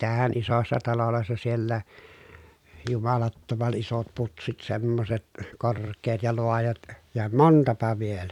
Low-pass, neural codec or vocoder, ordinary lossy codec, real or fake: 19.8 kHz; vocoder, 44.1 kHz, 128 mel bands every 256 samples, BigVGAN v2; none; fake